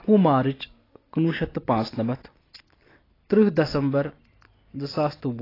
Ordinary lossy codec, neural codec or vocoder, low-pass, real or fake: AAC, 24 kbps; none; 5.4 kHz; real